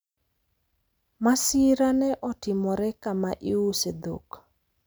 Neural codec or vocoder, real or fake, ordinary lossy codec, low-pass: none; real; none; none